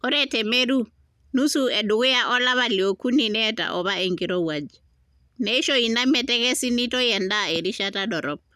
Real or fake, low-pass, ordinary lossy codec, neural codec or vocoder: real; 14.4 kHz; none; none